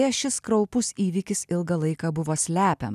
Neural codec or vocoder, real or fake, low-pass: none; real; 14.4 kHz